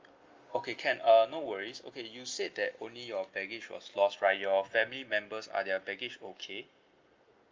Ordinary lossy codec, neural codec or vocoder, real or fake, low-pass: Opus, 32 kbps; none; real; 7.2 kHz